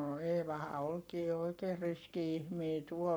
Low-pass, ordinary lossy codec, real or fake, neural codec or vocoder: none; none; fake; codec, 44.1 kHz, 7.8 kbps, Pupu-Codec